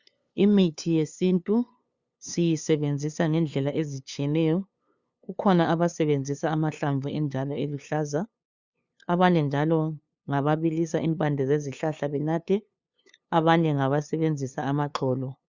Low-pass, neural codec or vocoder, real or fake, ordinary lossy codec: 7.2 kHz; codec, 16 kHz, 2 kbps, FunCodec, trained on LibriTTS, 25 frames a second; fake; Opus, 64 kbps